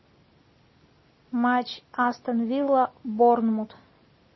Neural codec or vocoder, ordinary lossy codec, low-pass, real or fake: none; MP3, 24 kbps; 7.2 kHz; real